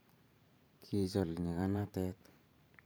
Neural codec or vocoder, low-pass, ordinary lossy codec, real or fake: vocoder, 44.1 kHz, 128 mel bands every 512 samples, BigVGAN v2; none; none; fake